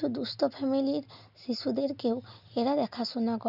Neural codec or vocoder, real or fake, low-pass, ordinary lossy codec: none; real; 5.4 kHz; none